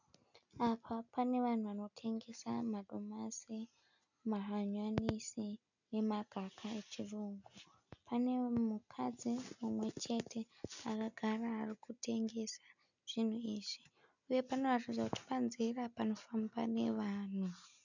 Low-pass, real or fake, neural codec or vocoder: 7.2 kHz; real; none